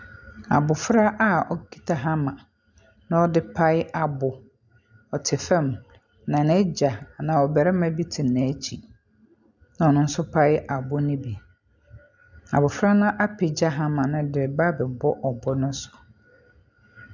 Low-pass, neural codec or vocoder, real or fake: 7.2 kHz; none; real